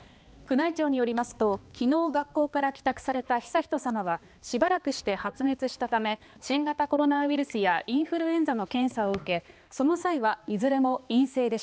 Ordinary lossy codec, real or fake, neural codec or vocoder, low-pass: none; fake; codec, 16 kHz, 2 kbps, X-Codec, HuBERT features, trained on balanced general audio; none